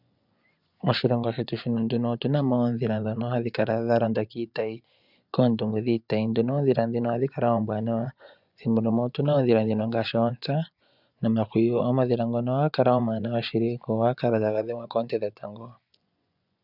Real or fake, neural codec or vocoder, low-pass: fake; vocoder, 24 kHz, 100 mel bands, Vocos; 5.4 kHz